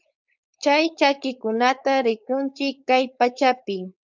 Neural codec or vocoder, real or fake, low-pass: codec, 16 kHz, 4.8 kbps, FACodec; fake; 7.2 kHz